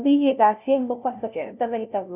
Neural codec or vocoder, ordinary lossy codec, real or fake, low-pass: codec, 16 kHz, 0.5 kbps, FunCodec, trained on LibriTTS, 25 frames a second; none; fake; 3.6 kHz